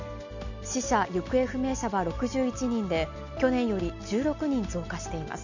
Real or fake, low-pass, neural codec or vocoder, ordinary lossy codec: real; 7.2 kHz; none; none